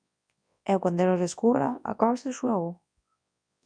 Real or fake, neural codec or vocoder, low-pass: fake; codec, 24 kHz, 0.9 kbps, WavTokenizer, large speech release; 9.9 kHz